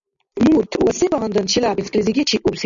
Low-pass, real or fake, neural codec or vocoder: 7.2 kHz; real; none